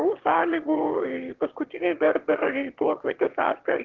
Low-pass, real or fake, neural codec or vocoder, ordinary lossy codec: 7.2 kHz; fake; autoencoder, 22.05 kHz, a latent of 192 numbers a frame, VITS, trained on one speaker; Opus, 16 kbps